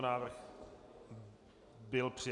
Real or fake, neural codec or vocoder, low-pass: real; none; 10.8 kHz